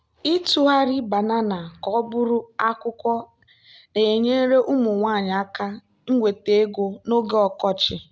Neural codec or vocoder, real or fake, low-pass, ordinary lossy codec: none; real; none; none